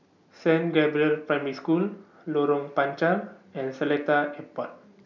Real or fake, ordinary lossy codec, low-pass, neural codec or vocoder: real; none; 7.2 kHz; none